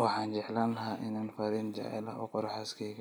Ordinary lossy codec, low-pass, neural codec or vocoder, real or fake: none; none; none; real